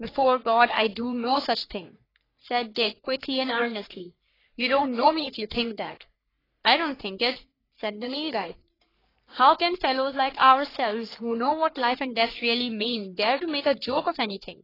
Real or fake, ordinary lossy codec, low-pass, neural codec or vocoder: fake; AAC, 24 kbps; 5.4 kHz; codec, 44.1 kHz, 3.4 kbps, Pupu-Codec